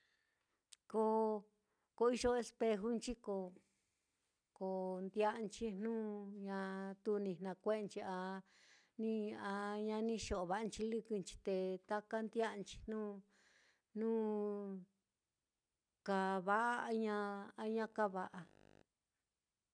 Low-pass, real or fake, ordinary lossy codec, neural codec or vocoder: 9.9 kHz; real; none; none